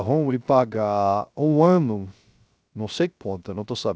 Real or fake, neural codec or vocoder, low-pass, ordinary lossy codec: fake; codec, 16 kHz, 0.3 kbps, FocalCodec; none; none